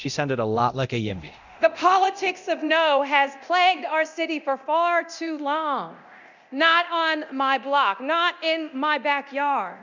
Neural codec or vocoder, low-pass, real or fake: codec, 24 kHz, 0.9 kbps, DualCodec; 7.2 kHz; fake